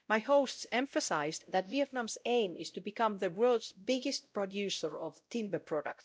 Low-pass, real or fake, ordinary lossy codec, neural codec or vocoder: none; fake; none; codec, 16 kHz, 0.5 kbps, X-Codec, WavLM features, trained on Multilingual LibriSpeech